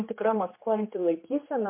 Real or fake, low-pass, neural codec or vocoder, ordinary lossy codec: fake; 3.6 kHz; codec, 16 kHz, 2 kbps, X-Codec, HuBERT features, trained on general audio; MP3, 24 kbps